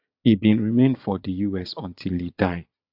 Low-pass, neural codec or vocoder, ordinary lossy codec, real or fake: 5.4 kHz; vocoder, 22.05 kHz, 80 mel bands, Vocos; AAC, 48 kbps; fake